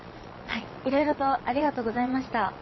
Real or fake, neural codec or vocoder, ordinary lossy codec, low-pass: fake; vocoder, 22.05 kHz, 80 mel bands, Vocos; MP3, 24 kbps; 7.2 kHz